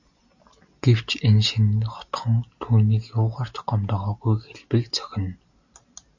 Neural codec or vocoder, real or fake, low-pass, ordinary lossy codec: none; real; 7.2 kHz; AAC, 48 kbps